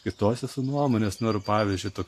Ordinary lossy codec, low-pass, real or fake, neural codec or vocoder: AAC, 64 kbps; 14.4 kHz; real; none